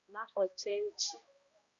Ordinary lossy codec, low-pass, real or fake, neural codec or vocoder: Opus, 64 kbps; 7.2 kHz; fake; codec, 16 kHz, 1 kbps, X-Codec, HuBERT features, trained on balanced general audio